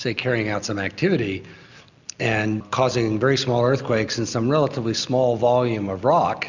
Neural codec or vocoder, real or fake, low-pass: none; real; 7.2 kHz